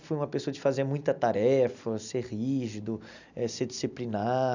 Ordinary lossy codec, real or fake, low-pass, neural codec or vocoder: none; real; 7.2 kHz; none